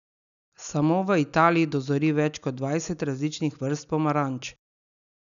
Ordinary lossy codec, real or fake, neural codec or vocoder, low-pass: none; real; none; 7.2 kHz